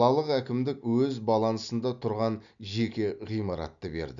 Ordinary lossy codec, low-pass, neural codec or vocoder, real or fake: none; 7.2 kHz; none; real